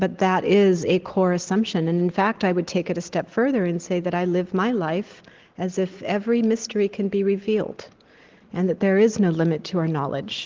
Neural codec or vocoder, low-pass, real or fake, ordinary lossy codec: none; 7.2 kHz; real; Opus, 16 kbps